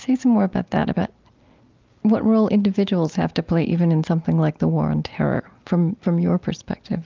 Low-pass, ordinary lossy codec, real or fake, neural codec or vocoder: 7.2 kHz; Opus, 24 kbps; real; none